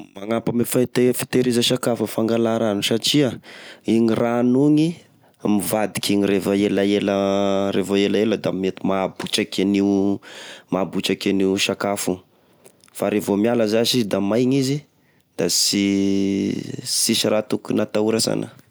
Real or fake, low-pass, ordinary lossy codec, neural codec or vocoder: real; none; none; none